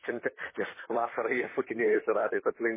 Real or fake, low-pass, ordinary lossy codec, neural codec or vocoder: fake; 3.6 kHz; MP3, 16 kbps; vocoder, 44.1 kHz, 128 mel bands, Pupu-Vocoder